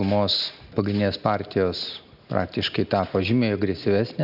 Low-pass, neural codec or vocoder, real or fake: 5.4 kHz; none; real